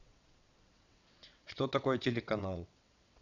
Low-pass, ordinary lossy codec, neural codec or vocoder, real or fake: 7.2 kHz; none; vocoder, 22.05 kHz, 80 mel bands, WaveNeXt; fake